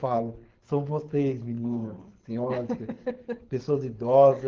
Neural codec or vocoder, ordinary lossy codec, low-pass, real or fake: codec, 24 kHz, 6 kbps, HILCodec; Opus, 16 kbps; 7.2 kHz; fake